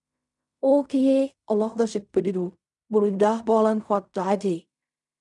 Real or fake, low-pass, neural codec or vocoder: fake; 10.8 kHz; codec, 16 kHz in and 24 kHz out, 0.4 kbps, LongCat-Audio-Codec, fine tuned four codebook decoder